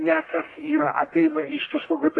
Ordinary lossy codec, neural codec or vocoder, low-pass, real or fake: AAC, 32 kbps; codec, 44.1 kHz, 1.7 kbps, Pupu-Codec; 10.8 kHz; fake